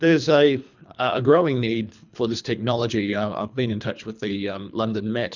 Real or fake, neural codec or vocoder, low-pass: fake; codec, 24 kHz, 3 kbps, HILCodec; 7.2 kHz